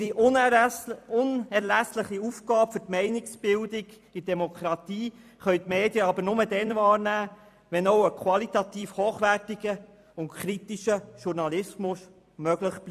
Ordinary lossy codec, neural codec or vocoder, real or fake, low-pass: MP3, 96 kbps; vocoder, 44.1 kHz, 128 mel bands every 256 samples, BigVGAN v2; fake; 14.4 kHz